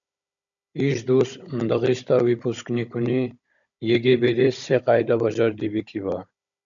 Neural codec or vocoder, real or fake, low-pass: codec, 16 kHz, 16 kbps, FunCodec, trained on Chinese and English, 50 frames a second; fake; 7.2 kHz